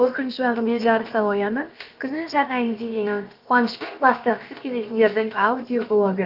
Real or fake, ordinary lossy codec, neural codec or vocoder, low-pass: fake; Opus, 24 kbps; codec, 16 kHz, about 1 kbps, DyCAST, with the encoder's durations; 5.4 kHz